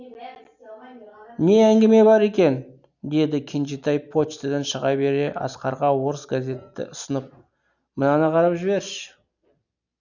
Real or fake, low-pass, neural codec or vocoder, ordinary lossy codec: real; 7.2 kHz; none; none